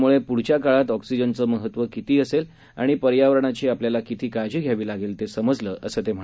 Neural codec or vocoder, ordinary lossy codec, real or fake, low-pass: none; none; real; none